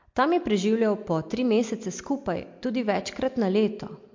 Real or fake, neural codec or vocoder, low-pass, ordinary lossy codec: real; none; 7.2 kHz; MP3, 48 kbps